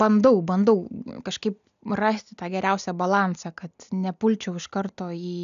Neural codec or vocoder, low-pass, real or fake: none; 7.2 kHz; real